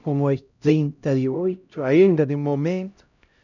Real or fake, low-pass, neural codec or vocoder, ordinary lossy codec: fake; 7.2 kHz; codec, 16 kHz, 0.5 kbps, X-Codec, HuBERT features, trained on LibriSpeech; none